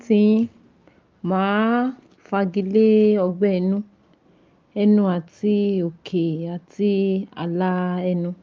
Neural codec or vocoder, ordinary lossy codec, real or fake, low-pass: none; Opus, 24 kbps; real; 7.2 kHz